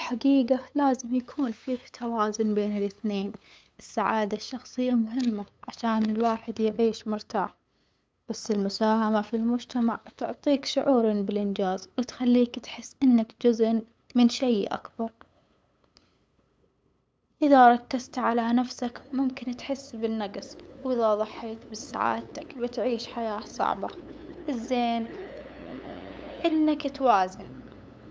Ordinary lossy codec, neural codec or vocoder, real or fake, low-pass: none; codec, 16 kHz, 8 kbps, FunCodec, trained on LibriTTS, 25 frames a second; fake; none